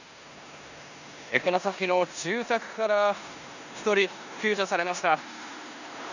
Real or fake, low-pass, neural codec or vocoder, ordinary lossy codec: fake; 7.2 kHz; codec, 16 kHz in and 24 kHz out, 0.9 kbps, LongCat-Audio-Codec, four codebook decoder; none